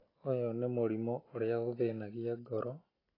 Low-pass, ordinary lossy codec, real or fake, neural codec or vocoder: 5.4 kHz; AAC, 24 kbps; real; none